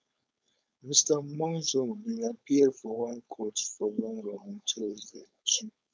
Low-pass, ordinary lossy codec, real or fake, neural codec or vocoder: none; none; fake; codec, 16 kHz, 4.8 kbps, FACodec